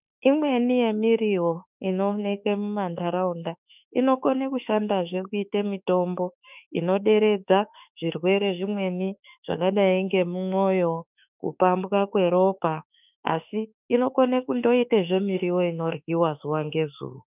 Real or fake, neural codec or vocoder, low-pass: fake; autoencoder, 48 kHz, 32 numbers a frame, DAC-VAE, trained on Japanese speech; 3.6 kHz